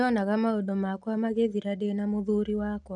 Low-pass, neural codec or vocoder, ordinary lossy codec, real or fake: 10.8 kHz; none; none; real